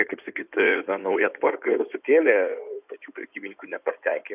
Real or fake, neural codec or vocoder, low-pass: fake; codec, 16 kHz in and 24 kHz out, 2.2 kbps, FireRedTTS-2 codec; 3.6 kHz